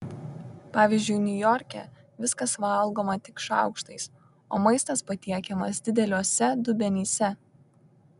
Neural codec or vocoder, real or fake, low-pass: none; real; 10.8 kHz